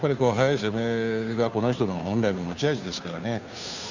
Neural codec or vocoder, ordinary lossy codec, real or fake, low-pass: codec, 16 kHz, 2 kbps, FunCodec, trained on Chinese and English, 25 frames a second; none; fake; 7.2 kHz